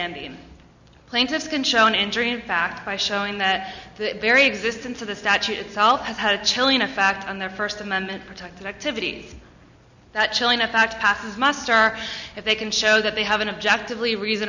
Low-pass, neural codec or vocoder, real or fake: 7.2 kHz; none; real